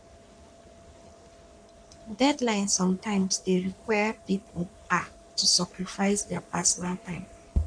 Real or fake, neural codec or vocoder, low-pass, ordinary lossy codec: fake; codec, 44.1 kHz, 3.4 kbps, Pupu-Codec; 9.9 kHz; none